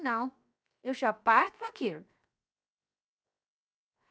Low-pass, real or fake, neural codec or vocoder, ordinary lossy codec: none; fake; codec, 16 kHz, 0.7 kbps, FocalCodec; none